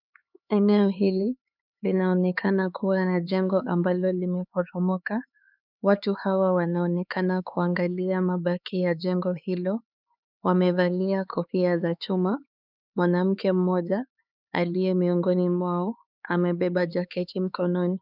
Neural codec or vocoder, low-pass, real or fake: codec, 16 kHz, 4 kbps, X-Codec, HuBERT features, trained on LibriSpeech; 5.4 kHz; fake